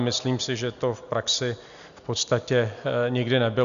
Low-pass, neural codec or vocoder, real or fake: 7.2 kHz; none; real